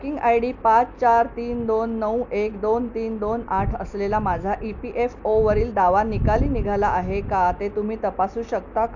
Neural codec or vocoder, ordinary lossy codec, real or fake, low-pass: none; none; real; 7.2 kHz